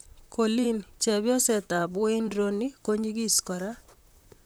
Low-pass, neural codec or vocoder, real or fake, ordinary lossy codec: none; vocoder, 44.1 kHz, 128 mel bands, Pupu-Vocoder; fake; none